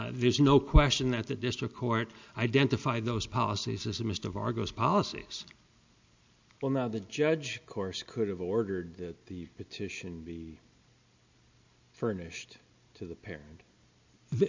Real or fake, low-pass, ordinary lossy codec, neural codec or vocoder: real; 7.2 kHz; MP3, 64 kbps; none